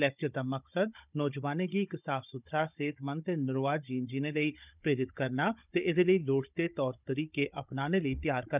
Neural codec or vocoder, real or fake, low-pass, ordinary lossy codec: codec, 16 kHz, 16 kbps, FunCodec, trained on Chinese and English, 50 frames a second; fake; 3.6 kHz; none